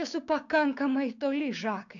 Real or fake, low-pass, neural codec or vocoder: fake; 7.2 kHz; codec, 16 kHz, 6 kbps, DAC